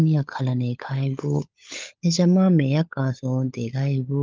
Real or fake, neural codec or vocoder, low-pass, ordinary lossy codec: fake; codec, 16 kHz, 16 kbps, FunCodec, trained on LibriTTS, 50 frames a second; 7.2 kHz; Opus, 32 kbps